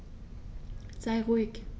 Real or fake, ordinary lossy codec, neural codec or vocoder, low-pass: real; none; none; none